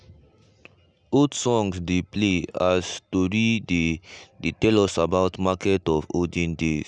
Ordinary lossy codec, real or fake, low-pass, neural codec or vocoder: none; real; 9.9 kHz; none